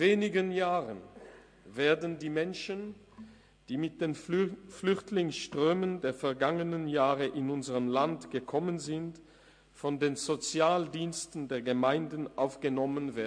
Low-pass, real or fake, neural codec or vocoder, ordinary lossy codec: 9.9 kHz; real; none; MP3, 48 kbps